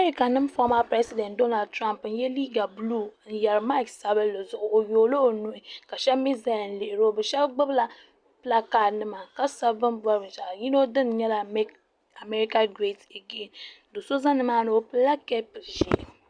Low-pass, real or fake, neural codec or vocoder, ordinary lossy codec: 9.9 kHz; real; none; Opus, 64 kbps